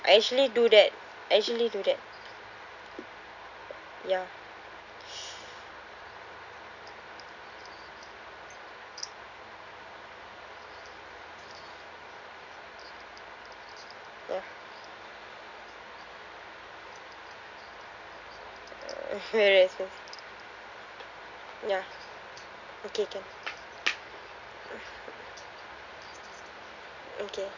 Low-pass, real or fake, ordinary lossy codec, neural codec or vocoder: 7.2 kHz; real; none; none